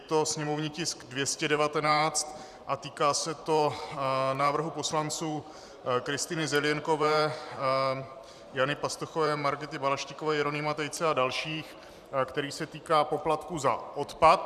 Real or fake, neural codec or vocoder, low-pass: fake; vocoder, 44.1 kHz, 128 mel bands every 512 samples, BigVGAN v2; 14.4 kHz